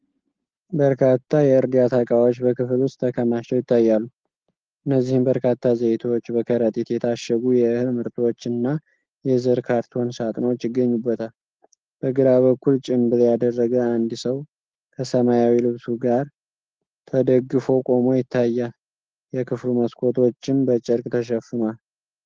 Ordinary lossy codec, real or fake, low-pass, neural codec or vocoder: Opus, 16 kbps; real; 7.2 kHz; none